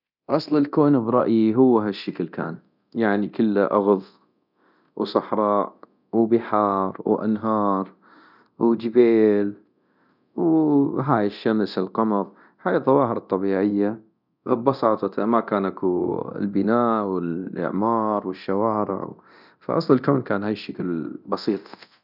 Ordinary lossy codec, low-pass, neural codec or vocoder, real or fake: none; 5.4 kHz; codec, 24 kHz, 0.9 kbps, DualCodec; fake